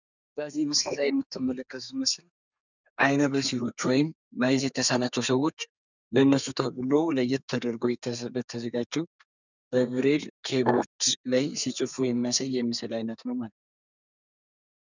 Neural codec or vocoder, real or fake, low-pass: codec, 32 kHz, 1.9 kbps, SNAC; fake; 7.2 kHz